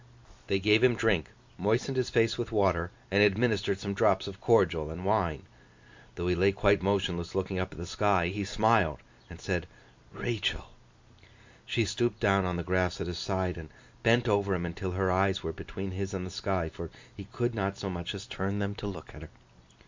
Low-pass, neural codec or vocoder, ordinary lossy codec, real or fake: 7.2 kHz; none; MP3, 64 kbps; real